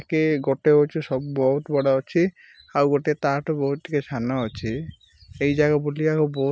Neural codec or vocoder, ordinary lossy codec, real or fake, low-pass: none; none; real; none